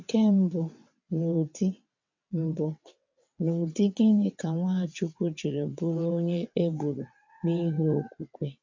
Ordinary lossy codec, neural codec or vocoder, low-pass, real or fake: MP3, 64 kbps; vocoder, 22.05 kHz, 80 mel bands, WaveNeXt; 7.2 kHz; fake